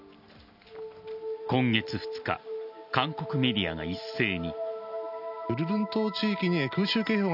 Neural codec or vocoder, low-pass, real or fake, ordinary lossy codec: none; 5.4 kHz; real; none